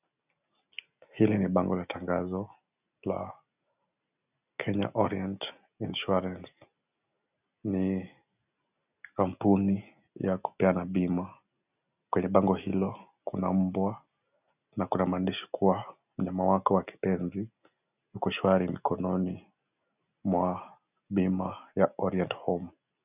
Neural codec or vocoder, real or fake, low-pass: none; real; 3.6 kHz